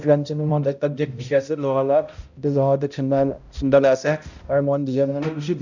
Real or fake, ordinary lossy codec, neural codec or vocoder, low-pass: fake; none; codec, 16 kHz, 0.5 kbps, X-Codec, HuBERT features, trained on balanced general audio; 7.2 kHz